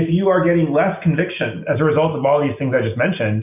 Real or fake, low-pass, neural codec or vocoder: real; 3.6 kHz; none